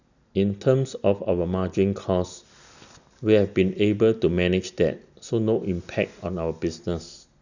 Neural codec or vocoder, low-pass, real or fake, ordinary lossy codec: none; 7.2 kHz; real; none